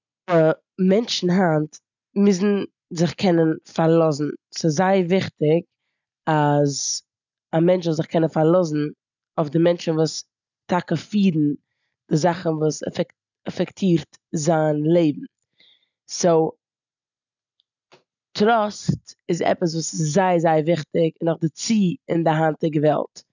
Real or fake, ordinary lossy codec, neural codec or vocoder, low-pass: real; none; none; 7.2 kHz